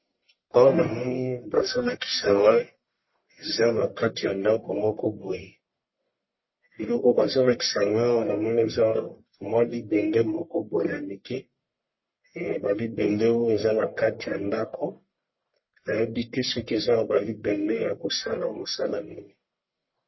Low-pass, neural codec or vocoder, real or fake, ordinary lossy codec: 7.2 kHz; codec, 44.1 kHz, 1.7 kbps, Pupu-Codec; fake; MP3, 24 kbps